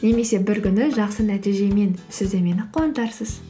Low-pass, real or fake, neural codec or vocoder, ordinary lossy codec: none; real; none; none